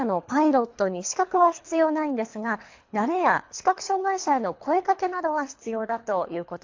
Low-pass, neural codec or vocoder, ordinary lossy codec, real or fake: 7.2 kHz; codec, 24 kHz, 3 kbps, HILCodec; AAC, 48 kbps; fake